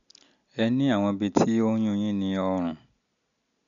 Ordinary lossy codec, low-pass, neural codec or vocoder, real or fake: none; 7.2 kHz; none; real